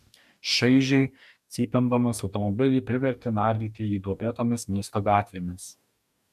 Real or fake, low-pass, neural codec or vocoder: fake; 14.4 kHz; codec, 44.1 kHz, 2.6 kbps, DAC